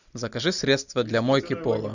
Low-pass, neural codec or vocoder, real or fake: 7.2 kHz; none; real